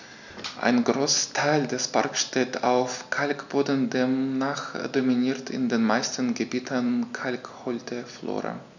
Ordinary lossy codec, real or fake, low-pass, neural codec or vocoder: none; real; 7.2 kHz; none